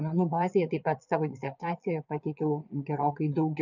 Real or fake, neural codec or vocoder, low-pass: fake; vocoder, 22.05 kHz, 80 mel bands, Vocos; 7.2 kHz